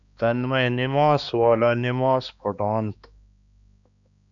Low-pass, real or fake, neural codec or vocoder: 7.2 kHz; fake; codec, 16 kHz, 2 kbps, X-Codec, HuBERT features, trained on balanced general audio